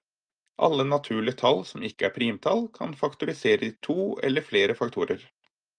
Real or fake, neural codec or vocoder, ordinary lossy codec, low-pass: real; none; Opus, 32 kbps; 9.9 kHz